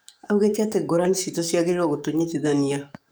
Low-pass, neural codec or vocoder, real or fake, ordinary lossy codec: none; codec, 44.1 kHz, 7.8 kbps, DAC; fake; none